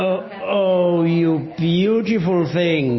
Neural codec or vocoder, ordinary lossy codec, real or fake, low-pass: none; MP3, 24 kbps; real; 7.2 kHz